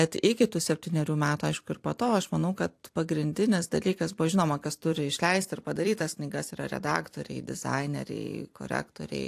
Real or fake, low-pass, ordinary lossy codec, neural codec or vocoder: fake; 14.4 kHz; AAC, 64 kbps; vocoder, 44.1 kHz, 128 mel bands every 256 samples, BigVGAN v2